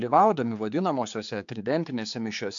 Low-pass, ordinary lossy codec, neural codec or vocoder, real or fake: 7.2 kHz; MP3, 64 kbps; codec, 16 kHz, 4 kbps, X-Codec, HuBERT features, trained on general audio; fake